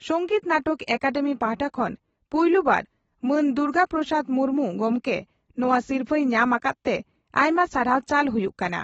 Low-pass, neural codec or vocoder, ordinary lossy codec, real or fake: 19.8 kHz; none; AAC, 24 kbps; real